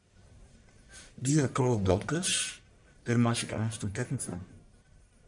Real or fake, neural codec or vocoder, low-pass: fake; codec, 44.1 kHz, 1.7 kbps, Pupu-Codec; 10.8 kHz